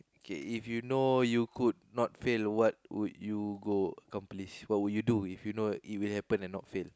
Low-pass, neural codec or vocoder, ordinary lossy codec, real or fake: none; none; none; real